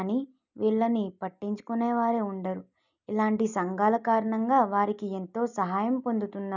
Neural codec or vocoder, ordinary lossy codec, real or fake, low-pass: none; none; real; 7.2 kHz